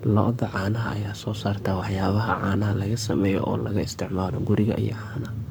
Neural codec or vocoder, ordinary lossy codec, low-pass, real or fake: codec, 44.1 kHz, 7.8 kbps, Pupu-Codec; none; none; fake